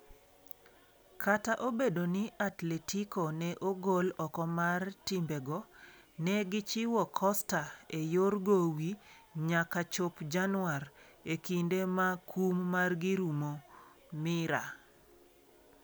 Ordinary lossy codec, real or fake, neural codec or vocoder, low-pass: none; real; none; none